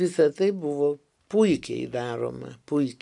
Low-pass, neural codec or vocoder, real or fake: 10.8 kHz; none; real